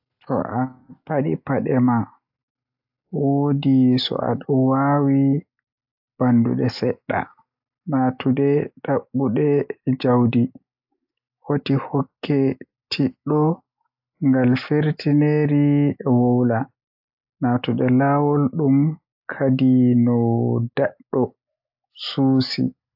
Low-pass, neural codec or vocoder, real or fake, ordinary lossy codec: 5.4 kHz; none; real; AAC, 48 kbps